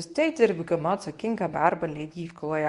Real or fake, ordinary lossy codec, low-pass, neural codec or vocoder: fake; Opus, 64 kbps; 10.8 kHz; codec, 24 kHz, 0.9 kbps, WavTokenizer, medium speech release version 1